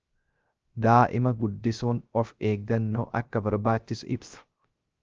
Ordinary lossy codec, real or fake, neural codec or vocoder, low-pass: Opus, 24 kbps; fake; codec, 16 kHz, 0.3 kbps, FocalCodec; 7.2 kHz